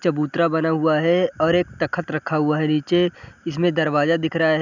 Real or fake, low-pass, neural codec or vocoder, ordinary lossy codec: real; 7.2 kHz; none; none